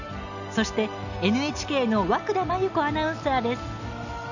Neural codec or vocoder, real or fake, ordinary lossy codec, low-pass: none; real; none; 7.2 kHz